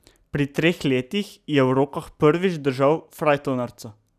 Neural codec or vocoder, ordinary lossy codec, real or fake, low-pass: none; none; real; 14.4 kHz